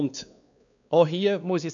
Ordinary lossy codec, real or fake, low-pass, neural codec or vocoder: none; fake; 7.2 kHz; codec, 16 kHz, 2 kbps, X-Codec, WavLM features, trained on Multilingual LibriSpeech